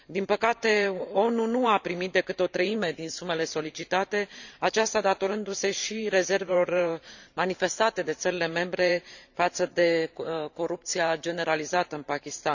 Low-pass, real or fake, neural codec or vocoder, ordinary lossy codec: 7.2 kHz; fake; vocoder, 44.1 kHz, 128 mel bands every 512 samples, BigVGAN v2; none